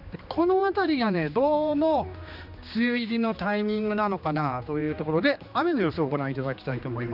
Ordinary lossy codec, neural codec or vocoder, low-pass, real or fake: none; codec, 16 kHz, 2 kbps, X-Codec, HuBERT features, trained on general audio; 5.4 kHz; fake